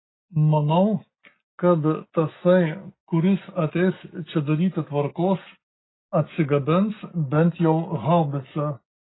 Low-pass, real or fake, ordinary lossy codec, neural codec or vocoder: 7.2 kHz; real; AAC, 16 kbps; none